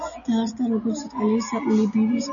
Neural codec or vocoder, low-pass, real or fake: none; 7.2 kHz; real